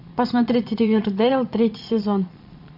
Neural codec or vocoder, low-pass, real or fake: vocoder, 22.05 kHz, 80 mel bands, Vocos; 5.4 kHz; fake